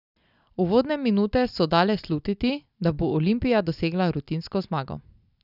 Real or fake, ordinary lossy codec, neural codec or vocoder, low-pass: real; none; none; 5.4 kHz